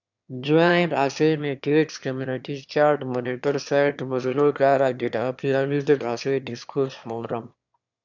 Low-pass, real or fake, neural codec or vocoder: 7.2 kHz; fake; autoencoder, 22.05 kHz, a latent of 192 numbers a frame, VITS, trained on one speaker